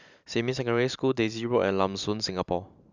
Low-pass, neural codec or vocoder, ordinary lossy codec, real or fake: 7.2 kHz; vocoder, 44.1 kHz, 128 mel bands every 512 samples, BigVGAN v2; none; fake